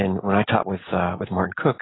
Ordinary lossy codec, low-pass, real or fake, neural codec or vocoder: AAC, 16 kbps; 7.2 kHz; real; none